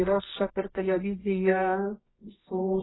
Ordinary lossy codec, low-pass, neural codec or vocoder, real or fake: AAC, 16 kbps; 7.2 kHz; codec, 24 kHz, 0.9 kbps, WavTokenizer, medium music audio release; fake